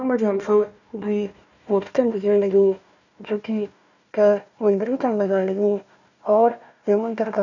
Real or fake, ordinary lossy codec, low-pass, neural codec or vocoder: fake; none; 7.2 kHz; codec, 16 kHz, 1 kbps, FunCodec, trained on Chinese and English, 50 frames a second